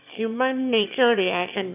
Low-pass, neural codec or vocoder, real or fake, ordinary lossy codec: 3.6 kHz; autoencoder, 22.05 kHz, a latent of 192 numbers a frame, VITS, trained on one speaker; fake; none